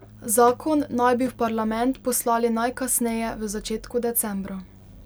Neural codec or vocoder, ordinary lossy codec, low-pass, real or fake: none; none; none; real